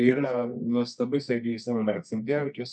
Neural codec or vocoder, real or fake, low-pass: codec, 24 kHz, 0.9 kbps, WavTokenizer, medium music audio release; fake; 9.9 kHz